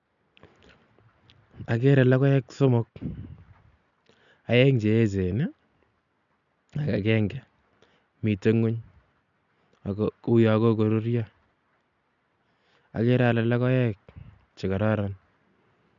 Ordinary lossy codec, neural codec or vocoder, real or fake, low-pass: none; none; real; 7.2 kHz